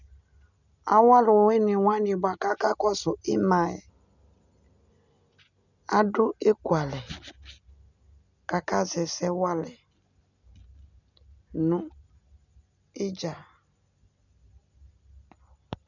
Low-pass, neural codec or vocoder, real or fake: 7.2 kHz; none; real